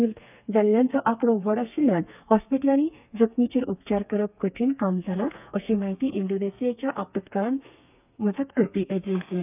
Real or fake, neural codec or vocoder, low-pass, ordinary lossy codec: fake; codec, 32 kHz, 1.9 kbps, SNAC; 3.6 kHz; none